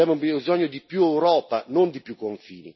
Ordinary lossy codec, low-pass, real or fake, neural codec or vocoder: MP3, 24 kbps; 7.2 kHz; real; none